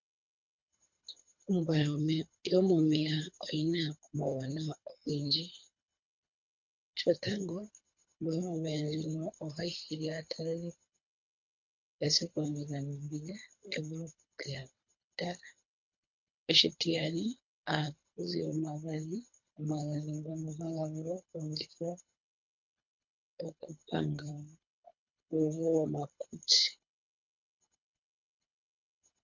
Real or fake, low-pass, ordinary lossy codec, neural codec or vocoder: fake; 7.2 kHz; MP3, 48 kbps; codec, 24 kHz, 3 kbps, HILCodec